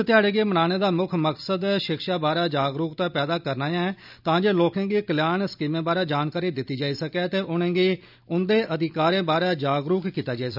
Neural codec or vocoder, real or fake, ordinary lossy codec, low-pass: none; real; none; 5.4 kHz